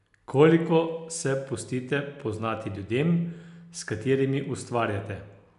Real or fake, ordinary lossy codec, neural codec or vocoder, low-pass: real; none; none; 10.8 kHz